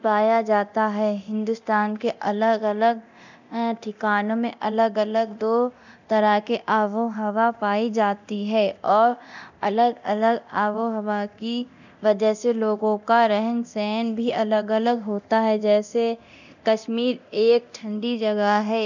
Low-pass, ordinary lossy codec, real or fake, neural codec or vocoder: 7.2 kHz; none; fake; codec, 24 kHz, 0.9 kbps, DualCodec